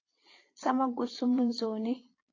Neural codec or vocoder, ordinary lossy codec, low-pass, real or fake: vocoder, 22.05 kHz, 80 mel bands, Vocos; AAC, 32 kbps; 7.2 kHz; fake